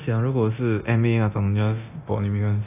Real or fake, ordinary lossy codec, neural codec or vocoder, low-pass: fake; AAC, 32 kbps; codec, 24 kHz, 0.9 kbps, DualCodec; 3.6 kHz